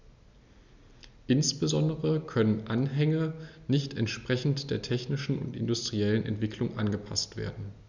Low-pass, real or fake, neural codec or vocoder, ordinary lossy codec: 7.2 kHz; real; none; none